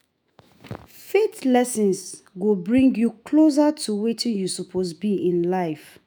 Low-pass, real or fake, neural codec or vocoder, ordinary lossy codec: none; fake; autoencoder, 48 kHz, 128 numbers a frame, DAC-VAE, trained on Japanese speech; none